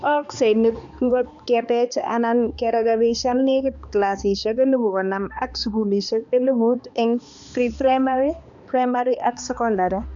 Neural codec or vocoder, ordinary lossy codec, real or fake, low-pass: codec, 16 kHz, 2 kbps, X-Codec, HuBERT features, trained on balanced general audio; none; fake; 7.2 kHz